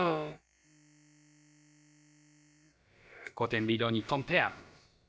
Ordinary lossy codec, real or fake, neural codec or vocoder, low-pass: none; fake; codec, 16 kHz, about 1 kbps, DyCAST, with the encoder's durations; none